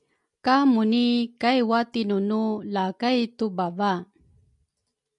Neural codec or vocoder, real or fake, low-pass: none; real; 10.8 kHz